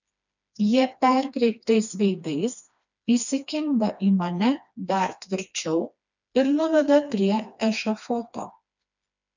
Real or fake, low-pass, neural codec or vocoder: fake; 7.2 kHz; codec, 16 kHz, 2 kbps, FreqCodec, smaller model